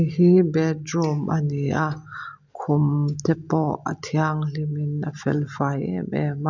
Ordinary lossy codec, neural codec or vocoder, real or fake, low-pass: none; none; real; 7.2 kHz